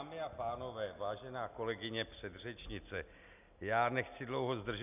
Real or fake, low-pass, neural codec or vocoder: real; 3.6 kHz; none